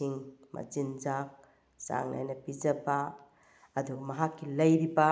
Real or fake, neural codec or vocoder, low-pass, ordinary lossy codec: real; none; none; none